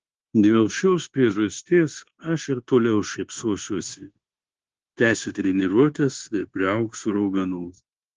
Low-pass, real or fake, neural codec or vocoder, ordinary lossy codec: 7.2 kHz; fake; codec, 16 kHz, 0.9 kbps, LongCat-Audio-Codec; Opus, 16 kbps